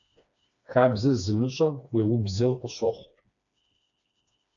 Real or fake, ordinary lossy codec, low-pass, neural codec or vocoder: fake; AAC, 64 kbps; 7.2 kHz; codec, 16 kHz, 2 kbps, FreqCodec, smaller model